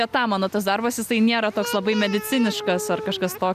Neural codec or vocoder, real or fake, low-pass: none; real; 14.4 kHz